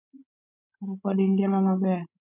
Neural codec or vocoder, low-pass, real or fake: codec, 16 kHz, 16 kbps, FreqCodec, larger model; 3.6 kHz; fake